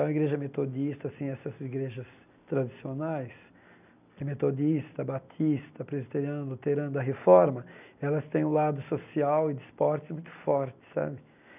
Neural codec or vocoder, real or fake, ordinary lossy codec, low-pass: none; real; none; 3.6 kHz